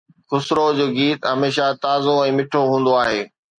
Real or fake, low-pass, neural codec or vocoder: real; 9.9 kHz; none